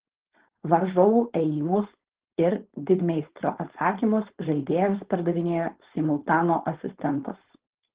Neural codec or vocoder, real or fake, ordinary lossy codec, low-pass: codec, 16 kHz, 4.8 kbps, FACodec; fake; Opus, 16 kbps; 3.6 kHz